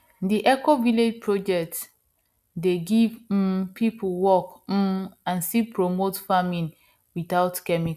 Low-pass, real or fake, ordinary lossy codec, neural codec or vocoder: 14.4 kHz; real; none; none